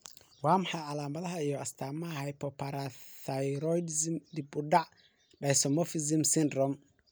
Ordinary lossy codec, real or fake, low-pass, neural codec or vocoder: none; real; none; none